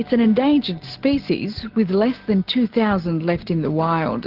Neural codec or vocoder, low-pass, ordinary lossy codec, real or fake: none; 5.4 kHz; Opus, 16 kbps; real